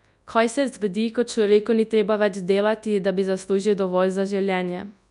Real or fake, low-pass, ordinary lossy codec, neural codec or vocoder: fake; 10.8 kHz; none; codec, 24 kHz, 0.9 kbps, WavTokenizer, large speech release